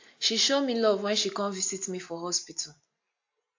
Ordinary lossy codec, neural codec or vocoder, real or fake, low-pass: AAC, 48 kbps; none; real; 7.2 kHz